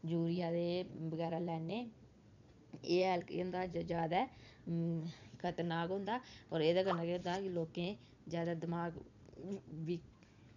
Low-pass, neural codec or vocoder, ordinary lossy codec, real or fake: 7.2 kHz; vocoder, 22.05 kHz, 80 mel bands, Vocos; none; fake